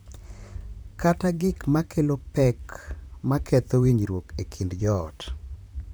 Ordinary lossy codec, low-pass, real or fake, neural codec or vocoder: none; none; fake; vocoder, 44.1 kHz, 128 mel bands, Pupu-Vocoder